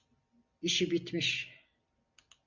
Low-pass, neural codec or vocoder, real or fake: 7.2 kHz; none; real